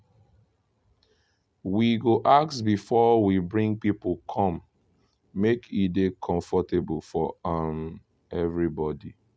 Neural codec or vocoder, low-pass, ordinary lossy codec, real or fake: none; none; none; real